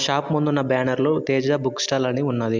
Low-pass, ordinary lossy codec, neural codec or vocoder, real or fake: 7.2 kHz; MP3, 64 kbps; none; real